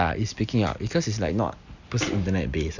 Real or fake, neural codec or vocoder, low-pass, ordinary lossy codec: real; none; 7.2 kHz; none